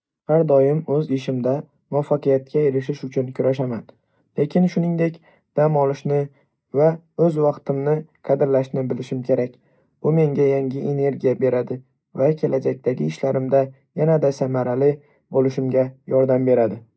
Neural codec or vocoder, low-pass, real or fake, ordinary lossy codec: none; none; real; none